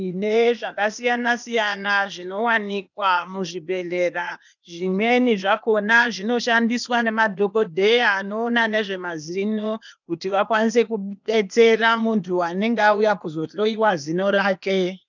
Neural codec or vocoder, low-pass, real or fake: codec, 16 kHz, 0.8 kbps, ZipCodec; 7.2 kHz; fake